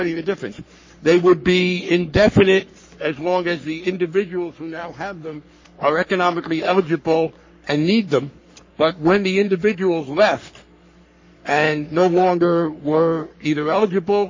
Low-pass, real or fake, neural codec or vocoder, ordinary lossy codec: 7.2 kHz; fake; codec, 44.1 kHz, 3.4 kbps, Pupu-Codec; MP3, 32 kbps